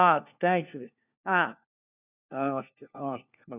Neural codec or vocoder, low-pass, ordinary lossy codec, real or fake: codec, 16 kHz, 1 kbps, FunCodec, trained on LibriTTS, 50 frames a second; 3.6 kHz; none; fake